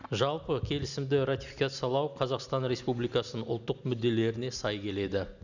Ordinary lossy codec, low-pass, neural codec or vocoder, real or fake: none; 7.2 kHz; none; real